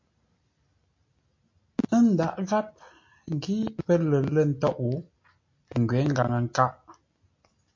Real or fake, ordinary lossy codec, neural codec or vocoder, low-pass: real; MP3, 48 kbps; none; 7.2 kHz